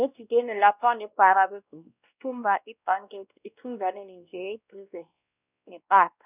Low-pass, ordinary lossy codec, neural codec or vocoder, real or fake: 3.6 kHz; none; codec, 16 kHz, 1 kbps, X-Codec, WavLM features, trained on Multilingual LibriSpeech; fake